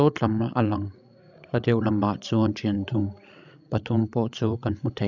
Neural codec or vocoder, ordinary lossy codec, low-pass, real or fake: codec, 16 kHz, 16 kbps, FreqCodec, larger model; none; 7.2 kHz; fake